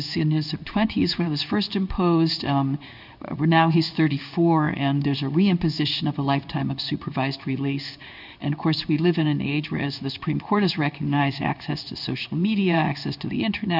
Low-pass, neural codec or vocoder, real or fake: 5.4 kHz; codec, 16 kHz in and 24 kHz out, 1 kbps, XY-Tokenizer; fake